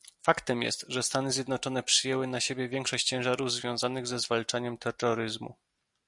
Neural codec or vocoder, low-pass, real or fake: none; 10.8 kHz; real